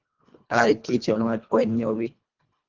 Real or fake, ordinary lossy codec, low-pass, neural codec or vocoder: fake; Opus, 32 kbps; 7.2 kHz; codec, 24 kHz, 1.5 kbps, HILCodec